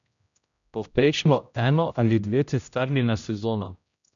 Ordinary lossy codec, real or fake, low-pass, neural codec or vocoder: none; fake; 7.2 kHz; codec, 16 kHz, 0.5 kbps, X-Codec, HuBERT features, trained on general audio